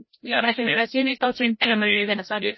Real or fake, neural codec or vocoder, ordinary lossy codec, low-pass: fake; codec, 16 kHz, 0.5 kbps, FreqCodec, larger model; MP3, 24 kbps; 7.2 kHz